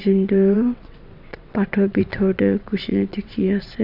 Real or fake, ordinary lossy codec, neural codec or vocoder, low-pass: fake; MP3, 48 kbps; vocoder, 22.05 kHz, 80 mel bands, WaveNeXt; 5.4 kHz